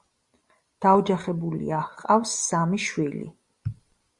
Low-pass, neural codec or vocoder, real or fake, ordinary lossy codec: 10.8 kHz; none; real; Opus, 64 kbps